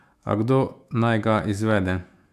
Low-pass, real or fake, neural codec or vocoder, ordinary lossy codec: 14.4 kHz; fake; autoencoder, 48 kHz, 128 numbers a frame, DAC-VAE, trained on Japanese speech; AAC, 96 kbps